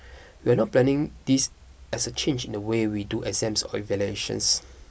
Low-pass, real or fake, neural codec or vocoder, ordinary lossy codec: none; real; none; none